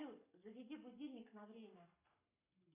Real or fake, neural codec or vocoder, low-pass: fake; codec, 44.1 kHz, 7.8 kbps, Pupu-Codec; 3.6 kHz